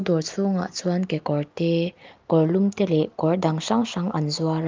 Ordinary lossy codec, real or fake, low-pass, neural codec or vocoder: Opus, 24 kbps; real; 7.2 kHz; none